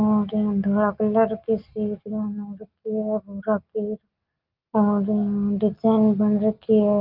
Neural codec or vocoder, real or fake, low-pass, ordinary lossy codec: none; real; 5.4 kHz; Opus, 24 kbps